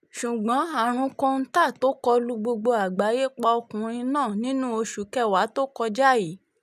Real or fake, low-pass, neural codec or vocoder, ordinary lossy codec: real; 14.4 kHz; none; none